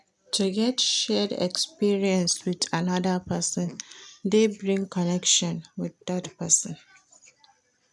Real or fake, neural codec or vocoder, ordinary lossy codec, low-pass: real; none; none; none